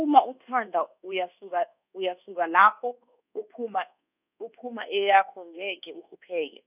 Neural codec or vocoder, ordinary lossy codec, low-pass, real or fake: codec, 24 kHz, 1.2 kbps, DualCodec; none; 3.6 kHz; fake